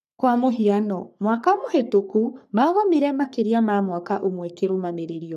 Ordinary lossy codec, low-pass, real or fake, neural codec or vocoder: none; 14.4 kHz; fake; codec, 44.1 kHz, 3.4 kbps, Pupu-Codec